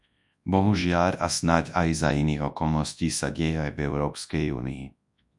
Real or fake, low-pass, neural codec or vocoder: fake; 10.8 kHz; codec, 24 kHz, 0.9 kbps, WavTokenizer, large speech release